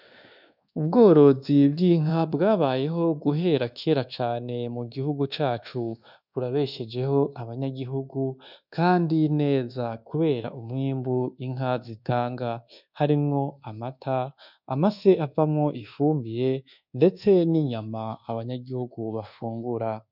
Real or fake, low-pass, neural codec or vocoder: fake; 5.4 kHz; codec, 24 kHz, 1.2 kbps, DualCodec